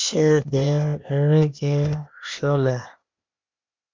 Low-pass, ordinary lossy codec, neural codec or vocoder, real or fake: 7.2 kHz; MP3, 64 kbps; codec, 16 kHz, 0.8 kbps, ZipCodec; fake